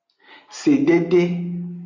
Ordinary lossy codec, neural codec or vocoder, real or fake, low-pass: MP3, 48 kbps; none; real; 7.2 kHz